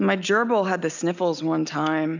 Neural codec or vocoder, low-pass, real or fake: none; 7.2 kHz; real